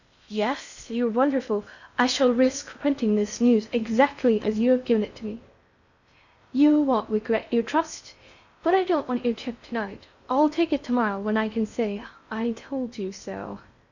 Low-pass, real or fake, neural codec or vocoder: 7.2 kHz; fake; codec, 16 kHz in and 24 kHz out, 0.6 kbps, FocalCodec, streaming, 2048 codes